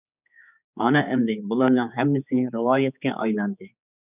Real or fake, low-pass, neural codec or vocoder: fake; 3.6 kHz; codec, 16 kHz, 4 kbps, X-Codec, HuBERT features, trained on general audio